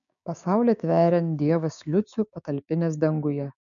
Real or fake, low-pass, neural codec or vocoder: fake; 7.2 kHz; codec, 16 kHz, 6 kbps, DAC